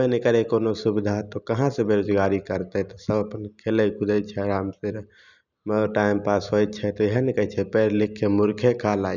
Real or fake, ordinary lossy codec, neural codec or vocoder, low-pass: real; none; none; 7.2 kHz